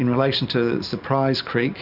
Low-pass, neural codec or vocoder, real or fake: 5.4 kHz; none; real